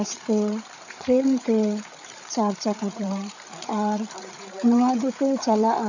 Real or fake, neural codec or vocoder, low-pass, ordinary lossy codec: fake; codec, 16 kHz, 8 kbps, FreqCodec, larger model; 7.2 kHz; none